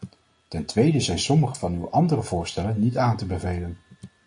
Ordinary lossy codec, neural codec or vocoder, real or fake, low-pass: AAC, 48 kbps; none; real; 9.9 kHz